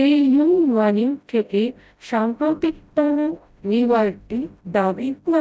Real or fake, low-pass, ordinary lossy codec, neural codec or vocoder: fake; none; none; codec, 16 kHz, 0.5 kbps, FreqCodec, smaller model